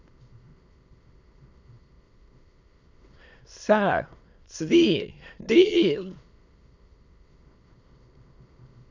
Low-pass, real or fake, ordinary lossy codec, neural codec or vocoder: 7.2 kHz; fake; Opus, 64 kbps; autoencoder, 22.05 kHz, a latent of 192 numbers a frame, VITS, trained on many speakers